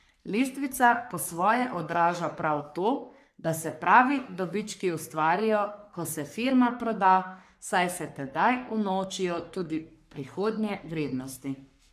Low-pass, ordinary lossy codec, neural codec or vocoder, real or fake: 14.4 kHz; AAC, 96 kbps; codec, 44.1 kHz, 3.4 kbps, Pupu-Codec; fake